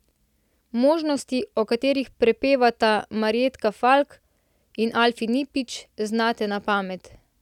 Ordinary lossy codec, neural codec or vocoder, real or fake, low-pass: none; none; real; 19.8 kHz